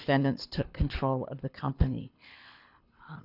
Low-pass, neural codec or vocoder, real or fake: 5.4 kHz; codec, 24 kHz, 6 kbps, HILCodec; fake